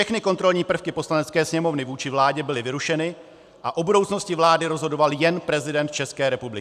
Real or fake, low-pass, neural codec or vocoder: real; 14.4 kHz; none